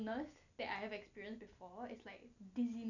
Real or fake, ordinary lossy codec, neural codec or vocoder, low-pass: real; none; none; 7.2 kHz